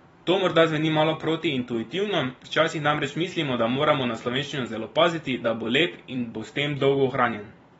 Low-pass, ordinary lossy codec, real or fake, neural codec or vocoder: 19.8 kHz; AAC, 24 kbps; fake; vocoder, 44.1 kHz, 128 mel bands every 256 samples, BigVGAN v2